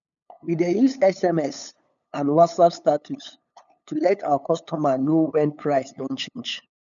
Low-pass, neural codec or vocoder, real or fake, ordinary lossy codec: 7.2 kHz; codec, 16 kHz, 8 kbps, FunCodec, trained on LibriTTS, 25 frames a second; fake; none